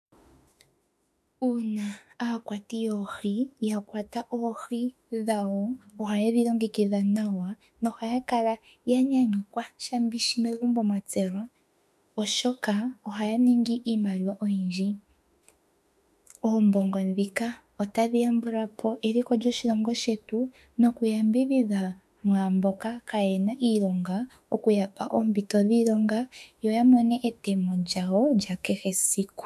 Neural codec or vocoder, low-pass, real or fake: autoencoder, 48 kHz, 32 numbers a frame, DAC-VAE, trained on Japanese speech; 14.4 kHz; fake